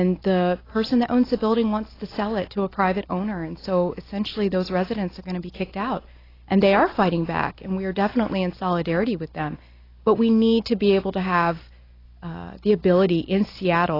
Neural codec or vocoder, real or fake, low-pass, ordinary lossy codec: none; real; 5.4 kHz; AAC, 24 kbps